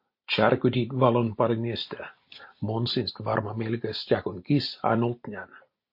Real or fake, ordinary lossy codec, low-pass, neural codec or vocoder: real; MP3, 32 kbps; 5.4 kHz; none